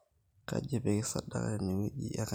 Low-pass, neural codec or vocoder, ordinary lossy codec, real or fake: none; none; none; real